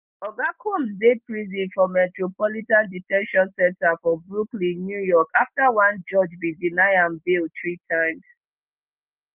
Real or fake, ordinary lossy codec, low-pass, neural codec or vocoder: real; Opus, 32 kbps; 3.6 kHz; none